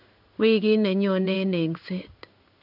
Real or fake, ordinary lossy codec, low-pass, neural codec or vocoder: fake; none; 5.4 kHz; codec, 16 kHz in and 24 kHz out, 1 kbps, XY-Tokenizer